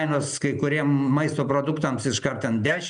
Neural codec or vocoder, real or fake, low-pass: none; real; 9.9 kHz